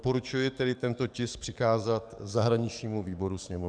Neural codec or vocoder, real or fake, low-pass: codec, 44.1 kHz, 7.8 kbps, DAC; fake; 9.9 kHz